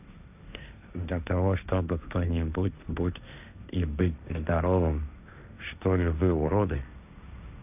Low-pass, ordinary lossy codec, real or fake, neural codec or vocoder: 3.6 kHz; none; fake; codec, 16 kHz, 1.1 kbps, Voila-Tokenizer